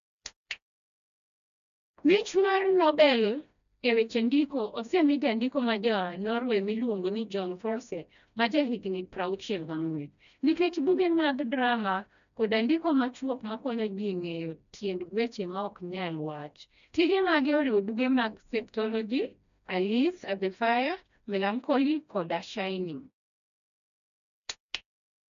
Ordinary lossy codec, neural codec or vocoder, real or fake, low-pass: AAC, 96 kbps; codec, 16 kHz, 1 kbps, FreqCodec, smaller model; fake; 7.2 kHz